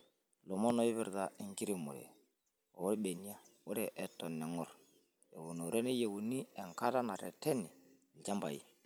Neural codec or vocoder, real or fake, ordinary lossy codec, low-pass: none; real; none; none